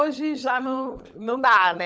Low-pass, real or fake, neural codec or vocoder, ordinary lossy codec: none; fake; codec, 16 kHz, 16 kbps, FunCodec, trained on LibriTTS, 50 frames a second; none